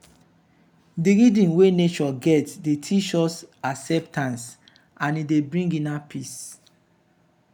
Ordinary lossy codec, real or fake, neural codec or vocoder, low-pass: none; real; none; 19.8 kHz